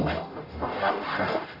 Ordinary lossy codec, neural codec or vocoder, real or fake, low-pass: AAC, 24 kbps; codec, 44.1 kHz, 0.9 kbps, DAC; fake; 5.4 kHz